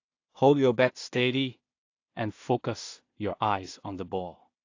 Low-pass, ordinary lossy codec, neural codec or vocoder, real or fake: 7.2 kHz; AAC, 48 kbps; codec, 16 kHz in and 24 kHz out, 0.4 kbps, LongCat-Audio-Codec, two codebook decoder; fake